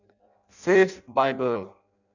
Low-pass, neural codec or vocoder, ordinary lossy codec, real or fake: 7.2 kHz; codec, 16 kHz in and 24 kHz out, 0.6 kbps, FireRedTTS-2 codec; none; fake